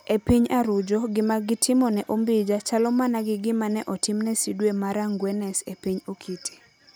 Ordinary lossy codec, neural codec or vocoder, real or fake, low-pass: none; none; real; none